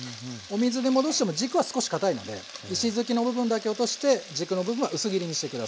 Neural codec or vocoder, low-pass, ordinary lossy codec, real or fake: none; none; none; real